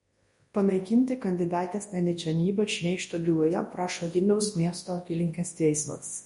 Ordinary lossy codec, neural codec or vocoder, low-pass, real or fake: MP3, 48 kbps; codec, 24 kHz, 0.9 kbps, WavTokenizer, large speech release; 10.8 kHz; fake